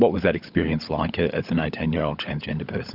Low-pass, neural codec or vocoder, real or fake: 5.4 kHz; codec, 16 kHz, 16 kbps, FunCodec, trained on LibriTTS, 50 frames a second; fake